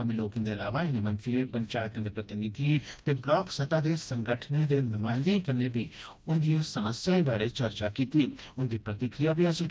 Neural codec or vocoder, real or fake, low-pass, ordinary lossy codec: codec, 16 kHz, 1 kbps, FreqCodec, smaller model; fake; none; none